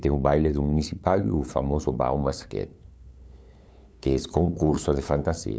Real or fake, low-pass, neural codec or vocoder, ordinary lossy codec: fake; none; codec, 16 kHz, 8 kbps, FunCodec, trained on LibriTTS, 25 frames a second; none